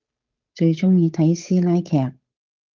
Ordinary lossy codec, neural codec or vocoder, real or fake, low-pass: Opus, 24 kbps; codec, 16 kHz, 8 kbps, FunCodec, trained on Chinese and English, 25 frames a second; fake; 7.2 kHz